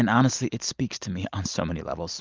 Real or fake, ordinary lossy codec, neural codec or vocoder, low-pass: real; Opus, 24 kbps; none; 7.2 kHz